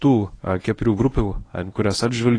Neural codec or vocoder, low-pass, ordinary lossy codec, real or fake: codec, 24 kHz, 0.9 kbps, WavTokenizer, medium speech release version 1; 9.9 kHz; AAC, 32 kbps; fake